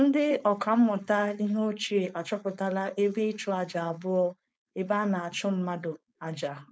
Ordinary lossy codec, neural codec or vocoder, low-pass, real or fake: none; codec, 16 kHz, 4.8 kbps, FACodec; none; fake